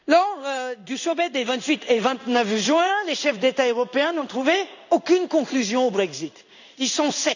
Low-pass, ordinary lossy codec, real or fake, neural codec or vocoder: 7.2 kHz; none; fake; codec, 16 kHz in and 24 kHz out, 1 kbps, XY-Tokenizer